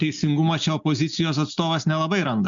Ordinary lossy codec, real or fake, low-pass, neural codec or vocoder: MP3, 64 kbps; real; 7.2 kHz; none